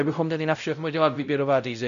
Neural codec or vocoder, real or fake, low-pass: codec, 16 kHz, 0.5 kbps, X-Codec, WavLM features, trained on Multilingual LibriSpeech; fake; 7.2 kHz